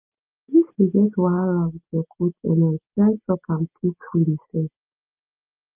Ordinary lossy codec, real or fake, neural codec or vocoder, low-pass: Opus, 32 kbps; real; none; 3.6 kHz